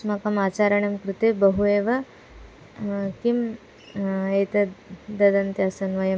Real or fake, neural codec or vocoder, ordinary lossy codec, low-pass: real; none; none; none